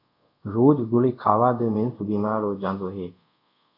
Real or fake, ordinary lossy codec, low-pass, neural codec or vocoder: fake; AAC, 48 kbps; 5.4 kHz; codec, 24 kHz, 0.5 kbps, DualCodec